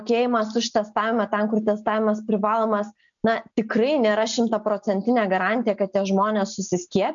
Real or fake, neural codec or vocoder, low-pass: real; none; 7.2 kHz